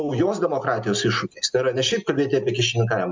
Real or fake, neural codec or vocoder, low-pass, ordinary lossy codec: real; none; 7.2 kHz; MP3, 64 kbps